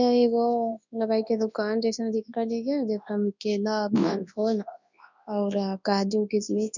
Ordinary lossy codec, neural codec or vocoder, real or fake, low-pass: none; codec, 24 kHz, 0.9 kbps, WavTokenizer, large speech release; fake; 7.2 kHz